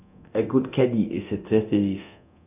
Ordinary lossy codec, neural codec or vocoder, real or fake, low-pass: none; codec, 24 kHz, 0.9 kbps, DualCodec; fake; 3.6 kHz